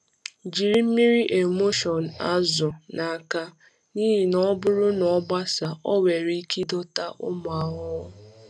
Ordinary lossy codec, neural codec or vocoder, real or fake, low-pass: none; none; real; none